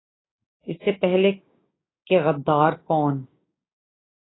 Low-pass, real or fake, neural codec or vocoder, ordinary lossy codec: 7.2 kHz; fake; codec, 16 kHz, 6 kbps, DAC; AAC, 16 kbps